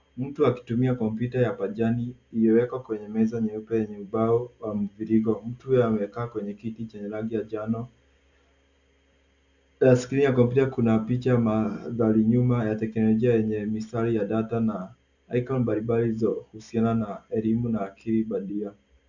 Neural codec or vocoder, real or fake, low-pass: none; real; 7.2 kHz